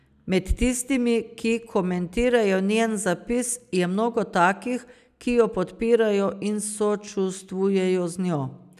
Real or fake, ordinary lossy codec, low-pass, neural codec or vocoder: real; none; 14.4 kHz; none